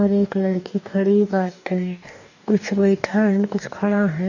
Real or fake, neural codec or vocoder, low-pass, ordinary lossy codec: fake; codec, 44.1 kHz, 2.6 kbps, DAC; 7.2 kHz; none